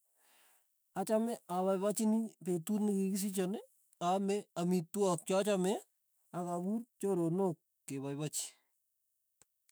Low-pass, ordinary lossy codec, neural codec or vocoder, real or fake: none; none; autoencoder, 48 kHz, 128 numbers a frame, DAC-VAE, trained on Japanese speech; fake